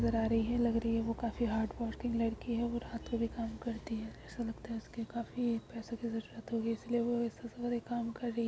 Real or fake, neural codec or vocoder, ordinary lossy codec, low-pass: real; none; none; none